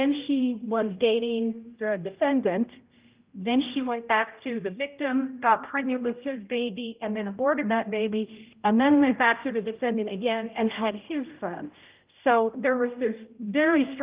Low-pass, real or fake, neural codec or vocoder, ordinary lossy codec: 3.6 kHz; fake; codec, 16 kHz, 0.5 kbps, X-Codec, HuBERT features, trained on general audio; Opus, 32 kbps